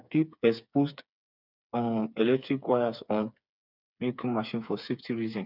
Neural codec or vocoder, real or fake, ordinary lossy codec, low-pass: codec, 16 kHz, 4 kbps, FreqCodec, smaller model; fake; none; 5.4 kHz